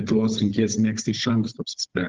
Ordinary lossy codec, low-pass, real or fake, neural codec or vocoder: Opus, 16 kbps; 7.2 kHz; fake; codec, 16 kHz, 16 kbps, FunCodec, trained on Chinese and English, 50 frames a second